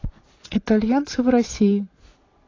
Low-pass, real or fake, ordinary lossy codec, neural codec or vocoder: 7.2 kHz; fake; AAC, 32 kbps; vocoder, 22.05 kHz, 80 mel bands, Vocos